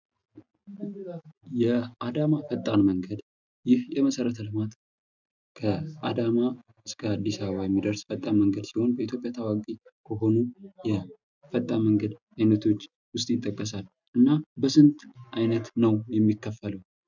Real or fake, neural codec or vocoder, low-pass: real; none; 7.2 kHz